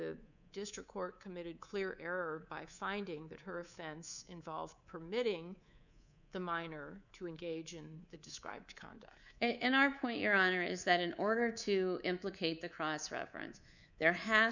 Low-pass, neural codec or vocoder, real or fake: 7.2 kHz; codec, 24 kHz, 3.1 kbps, DualCodec; fake